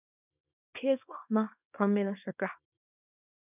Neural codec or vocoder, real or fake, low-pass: codec, 24 kHz, 0.9 kbps, WavTokenizer, small release; fake; 3.6 kHz